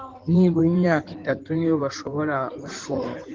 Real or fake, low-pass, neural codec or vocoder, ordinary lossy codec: fake; 7.2 kHz; codec, 16 kHz in and 24 kHz out, 2.2 kbps, FireRedTTS-2 codec; Opus, 16 kbps